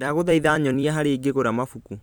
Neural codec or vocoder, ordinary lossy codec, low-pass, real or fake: vocoder, 44.1 kHz, 128 mel bands every 256 samples, BigVGAN v2; none; none; fake